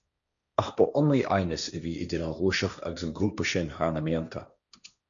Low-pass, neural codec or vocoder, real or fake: 7.2 kHz; codec, 16 kHz, 1.1 kbps, Voila-Tokenizer; fake